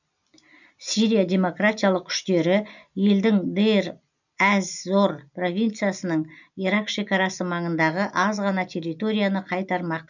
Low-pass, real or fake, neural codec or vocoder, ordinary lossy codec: 7.2 kHz; real; none; none